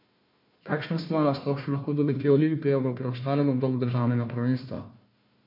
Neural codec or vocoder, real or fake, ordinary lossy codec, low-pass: codec, 16 kHz, 1 kbps, FunCodec, trained on Chinese and English, 50 frames a second; fake; MP3, 32 kbps; 5.4 kHz